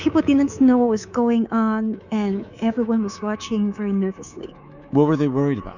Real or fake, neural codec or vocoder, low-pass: fake; codec, 24 kHz, 3.1 kbps, DualCodec; 7.2 kHz